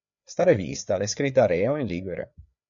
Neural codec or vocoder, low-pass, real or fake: codec, 16 kHz, 4 kbps, FreqCodec, larger model; 7.2 kHz; fake